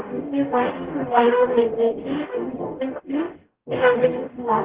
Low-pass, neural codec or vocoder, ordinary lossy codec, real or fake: 3.6 kHz; codec, 44.1 kHz, 0.9 kbps, DAC; Opus, 16 kbps; fake